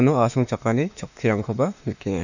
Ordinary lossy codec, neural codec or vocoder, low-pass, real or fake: none; autoencoder, 48 kHz, 32 numbers a frame, DAC-VAE, trained on Japanese speech; 7.2 kHz; fake